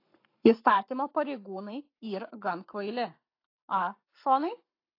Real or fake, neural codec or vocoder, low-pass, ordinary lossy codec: fake; codec, 44.1 kHz, 7.8 kbps, Pupu-Codec; 5.4 kHz; AAC, 32 kbps